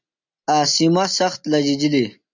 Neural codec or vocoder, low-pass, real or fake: none; 7.2 kHz; real